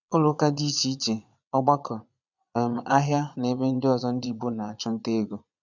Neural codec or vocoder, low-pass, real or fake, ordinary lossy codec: vocoder, 22.05 kHz, 80 mel bands, Vocos; 7.2 kHz; fake; none